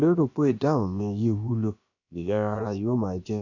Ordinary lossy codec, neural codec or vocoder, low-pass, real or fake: none; codec, 16 kHz, about 1 kbps, DyCAST, with the encoder's durations; 7.2 kHz; fake